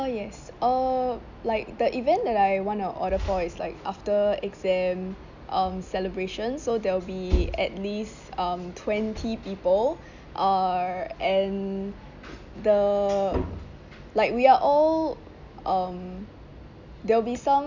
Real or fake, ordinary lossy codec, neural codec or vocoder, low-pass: real; none; none; 7.2 kHz